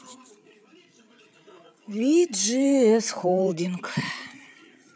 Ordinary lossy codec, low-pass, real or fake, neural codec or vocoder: none; none; fake; codec, 16 kHz, 8 kbps, FreqCodec, larger model